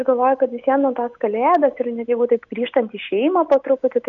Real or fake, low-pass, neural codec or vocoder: real; 7.2 kHz; none